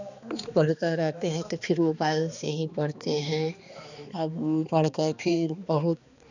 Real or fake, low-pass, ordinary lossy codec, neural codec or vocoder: fake; 7.2 kHz; none; codec, 16 kHz, 2 kbps, X-Codec, HuBERT features, trained on balanced general audio